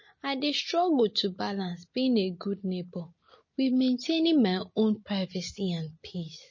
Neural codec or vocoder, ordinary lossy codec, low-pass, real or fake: none; MP3, 32 kbps; 7.2 kHz; real